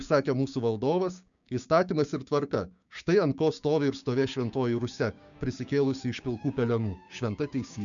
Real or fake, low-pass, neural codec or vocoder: fake; 7.2 kHz; codec, 16 kHz, 6 kbps, DAC